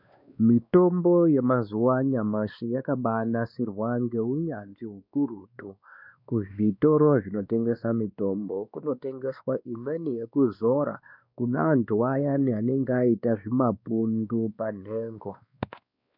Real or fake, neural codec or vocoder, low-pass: fake; codec, 16 kHz, 2 kbps, X-Codec, WavLM features, trained on Multilingual LibriSpeech; 5.4 kHz